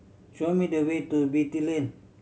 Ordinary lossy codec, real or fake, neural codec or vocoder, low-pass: none; real; none; none